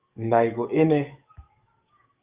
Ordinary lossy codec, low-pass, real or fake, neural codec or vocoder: Opus, 32 kbps; 3.6 kHz; fake; codec, 44.1 kHz, 7.8 kbps, DAC